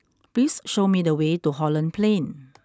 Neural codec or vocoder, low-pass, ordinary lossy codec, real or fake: none; none; none; real